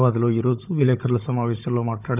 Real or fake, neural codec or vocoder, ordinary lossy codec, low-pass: fake; codec, 16 kHz, 16 kbps, FunCodec, trained on Chinese and English, 50 frames a second; none; 3.6 kHz